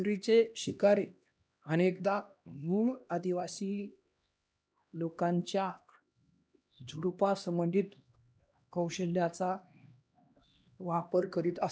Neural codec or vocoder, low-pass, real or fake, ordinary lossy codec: codec, 16 kHz, 1 kbps, X-Codec, HuBERT features, trained on LibriSpeech; none; fake; none